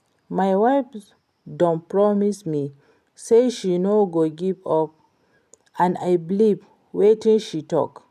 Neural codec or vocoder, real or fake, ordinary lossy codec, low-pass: none; real; none; 14.4 kHz